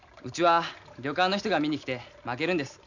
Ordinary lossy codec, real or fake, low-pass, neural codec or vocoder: none; real; 7.2 kHz; none